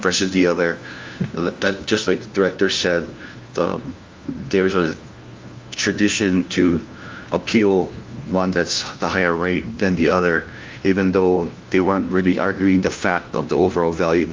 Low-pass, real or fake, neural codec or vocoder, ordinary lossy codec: 7.2 kHz; fake; codec, 16 kHz, 1 kbps, FunCodec, trained on LibriTTS, 50 frames a second; Opus, 32 kbps